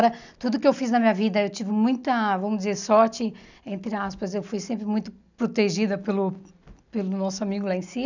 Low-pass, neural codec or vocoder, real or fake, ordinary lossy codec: 7.2 kHz; none; real; none